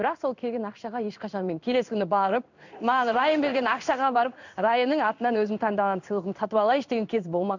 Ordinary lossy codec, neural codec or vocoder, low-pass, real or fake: none; codec, 16 kHz in and 24 kHz out, 1 kbps, XY-Tokenizer; 7.2 kHz; fake